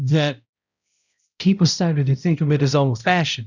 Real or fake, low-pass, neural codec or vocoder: fake; 7.2 kHz; codec, 16 kHz, 0.5 kbps, X-Codec, HuBERT features, trained on balanced general audio